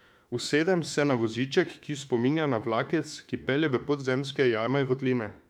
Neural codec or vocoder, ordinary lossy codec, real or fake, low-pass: autoencoder, 48 kHz, 32 numbers a frame, DAC-VAE, trained on Japanese speech; none; fake; 19.8 kHz